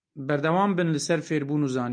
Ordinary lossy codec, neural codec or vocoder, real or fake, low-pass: MP3, 64 kbps; none; real; 9.9 kHz